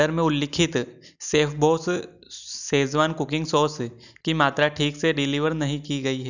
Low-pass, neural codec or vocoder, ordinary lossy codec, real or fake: 7.2 kHz; none; none; real